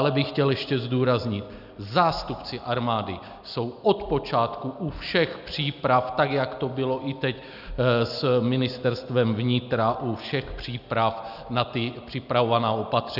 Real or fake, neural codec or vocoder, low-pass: real; none; 5.4 kHz